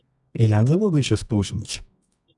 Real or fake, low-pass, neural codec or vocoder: fake; 10.8 kHz; codec, 24 kHz, 0.9 kbps, WavTokenizer, medium music audio release